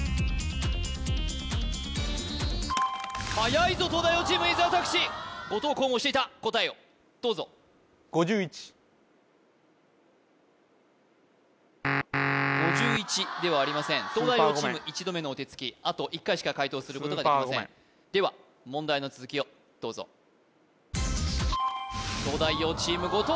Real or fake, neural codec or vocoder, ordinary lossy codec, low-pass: real; none; none; none